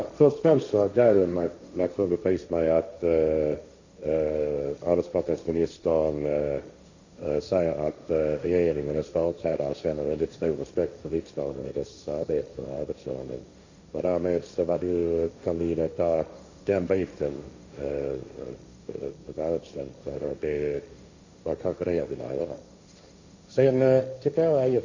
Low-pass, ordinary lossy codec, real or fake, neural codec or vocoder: 7.2 kHz; Opus, 64 kbps; fake; codec, 16 kHz, 1.1 kbps, Voila-Tokenizer